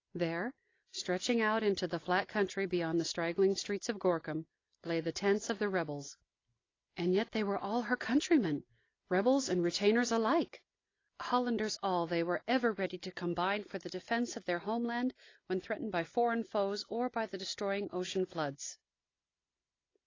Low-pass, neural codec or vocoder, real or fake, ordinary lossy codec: 7.2 kHz; none; real; AAC, 32 kbps